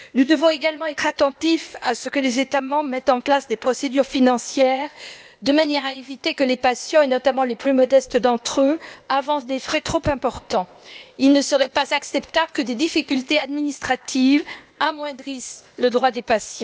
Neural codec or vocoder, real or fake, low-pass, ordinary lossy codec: codec, 16 kHz, 0.8 kbps, ZipCodec; fake; none; none